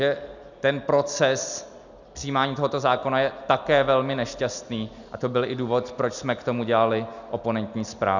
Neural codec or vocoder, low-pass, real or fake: none; 7.2 kHz; real